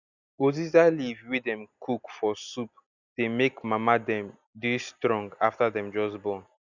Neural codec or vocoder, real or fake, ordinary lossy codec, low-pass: none; real; none; 7.2 kHz